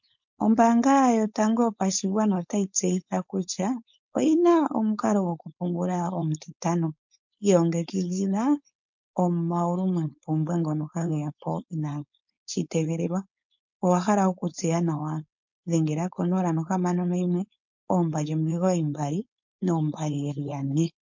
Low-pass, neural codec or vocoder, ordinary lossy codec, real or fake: 7.2 kHz; codec, 16 kHz, 4.8 kbps, FACodec; MP3, 48 kbps; fake